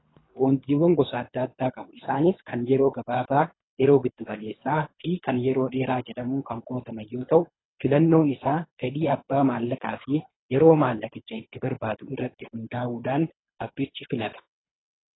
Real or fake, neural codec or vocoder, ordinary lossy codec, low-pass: fake; codec, 24 kHz, 3 kbps, HILCodec; AAC, 16 kbps; 7.2 kHz